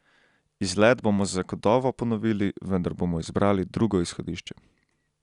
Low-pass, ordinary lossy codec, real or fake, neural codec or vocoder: 10.8 kHz; Opus, 64 kbps; real; none